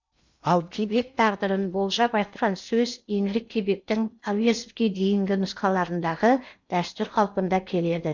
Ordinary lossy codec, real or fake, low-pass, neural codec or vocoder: MP3, 64 kbps; fake; 7.2 kHz; codec, 16 kHz in and 24 kHz out, 0.8 kbps, FocalCodec, streaming, 65536 codes